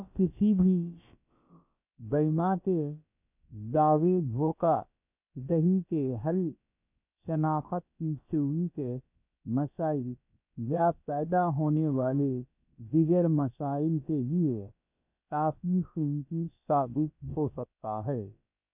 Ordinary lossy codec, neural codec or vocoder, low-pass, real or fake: none; codec, 16 kHz, about 1 kbps, DyCAST, with the encoder's durations; 3.6 kHz; fake